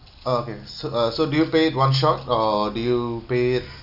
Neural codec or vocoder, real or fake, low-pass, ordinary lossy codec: none; real; 5.4 kHz; none